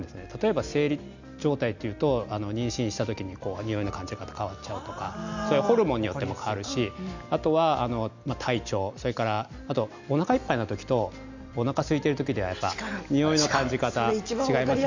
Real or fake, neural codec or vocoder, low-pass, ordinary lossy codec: real; none; 7.2 kHz; none